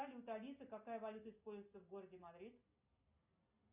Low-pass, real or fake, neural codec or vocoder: 3.6 kHz; real; none